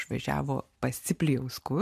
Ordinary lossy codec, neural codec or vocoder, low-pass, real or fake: MP3, 96 kbps; none; 14.4 kHz; real